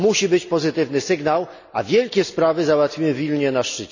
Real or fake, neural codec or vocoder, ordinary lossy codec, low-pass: real; none; none; 7.2 kHz